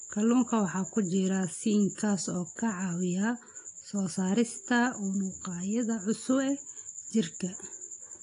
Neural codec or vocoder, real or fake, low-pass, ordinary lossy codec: none; real; 10.8 kHz; AAC, 48 kbps